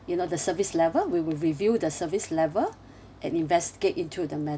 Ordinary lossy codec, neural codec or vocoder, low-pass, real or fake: none; none; none; real